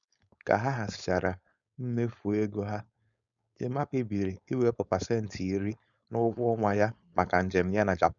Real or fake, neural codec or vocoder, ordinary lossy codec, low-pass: fake; codec, 16 kHz, 4.8 kbps, FACodec; none; 7.2 kHz